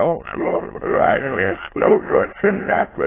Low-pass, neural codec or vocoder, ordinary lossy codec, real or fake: 3.6 kHz; autoencoder, 22.05 kHz, a latent of 192 numbers a frame, VITS, trained on many speakers; AAC, 16 kbps; fake